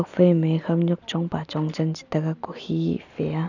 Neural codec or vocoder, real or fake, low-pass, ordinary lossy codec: none; real; 7.2 kHz; none